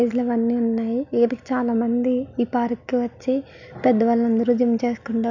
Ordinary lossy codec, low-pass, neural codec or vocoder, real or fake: none; 7.2 kHz; none; real